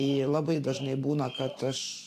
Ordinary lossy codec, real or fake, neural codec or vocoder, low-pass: AAC, 64 kbps; fake; vocoder, 44.1 kHz, 128 mel bands every 512 samples, BigVGAN v2; 14.4 kHz